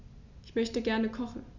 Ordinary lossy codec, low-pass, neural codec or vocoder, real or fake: MP3, 48 kbps; 7.2 kHz; none; real